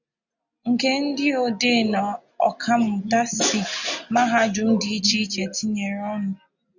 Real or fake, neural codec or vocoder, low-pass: real; none; 7.2 kHz